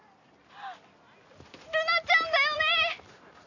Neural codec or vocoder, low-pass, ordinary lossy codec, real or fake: none; 7.2 kHz; none; real